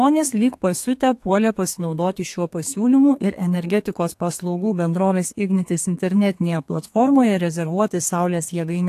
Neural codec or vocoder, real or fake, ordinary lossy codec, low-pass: codec, 32 kHz, 1.9 kbps, SNAC; fake; AAC, 64 kbps; 14.4 kHz